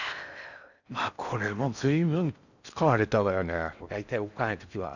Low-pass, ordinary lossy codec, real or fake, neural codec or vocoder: 7.2 kHz; none; fake; codec, 16 kHz in and 24 kHz out, 0.6 kbps, FocalCodec, streaming, 4096 codes